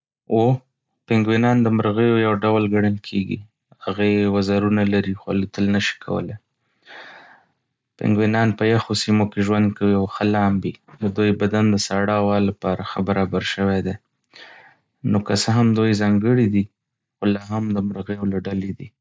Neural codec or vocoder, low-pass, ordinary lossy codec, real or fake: none; none; none; real